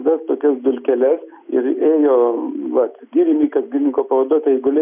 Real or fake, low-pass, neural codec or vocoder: real; 3.6 kHz; none